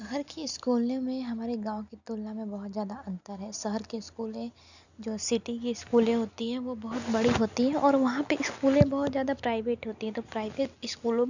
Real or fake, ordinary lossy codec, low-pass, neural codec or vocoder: real; none; 7.2 kHz; none